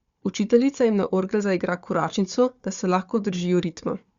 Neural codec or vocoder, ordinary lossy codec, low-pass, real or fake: codec, 16 kHz, 4 kbps, FunCodec, trained on Chinese and English, 50 frames a second; Opus, 64 kbps; 7.2 kHz; fake